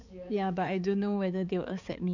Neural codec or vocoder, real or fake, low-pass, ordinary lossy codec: codec, 16 kHz, 4 kbps, X-Codec, HuBERT features, trained on balanced general audio; fake; 7.2 kHz; none